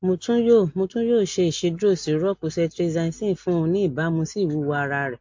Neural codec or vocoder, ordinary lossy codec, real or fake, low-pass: none; MP3, 48 kbps; real; 7.2 kHz